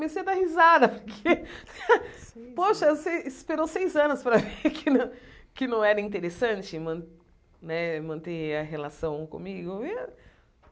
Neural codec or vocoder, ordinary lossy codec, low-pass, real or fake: none; none; none; real